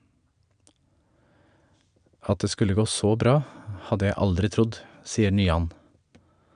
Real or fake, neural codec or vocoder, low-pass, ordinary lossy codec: real; none; 9.9 kHz; none